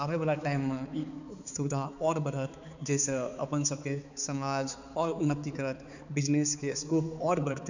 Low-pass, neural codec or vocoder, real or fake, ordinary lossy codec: 7.2 kHz; codec, 16 kHz, 4 kbps, X-Codec, HuBERT features, trained on balanced general audio; fake; none